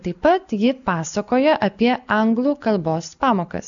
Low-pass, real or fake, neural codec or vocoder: 7.2 kHz; real; none